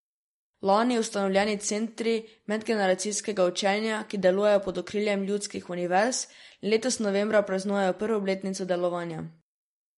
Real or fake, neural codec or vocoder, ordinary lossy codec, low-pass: real; none; MP3, 48 kbps; 19.8 kHz